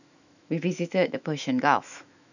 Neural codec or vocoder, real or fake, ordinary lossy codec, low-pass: autoencoder, 48 kHz, 128 numbers a frame, DAC-VAE, trained on Japanese speech; fake; none; 7.2 kHz